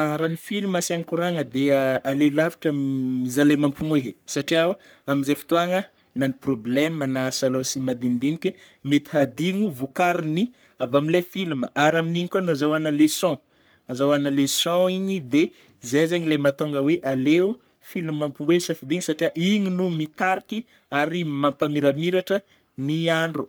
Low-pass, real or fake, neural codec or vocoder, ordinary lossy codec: none; fake; codec, 44.1 kHz, 3.4 kbps, Pupu-Codec; none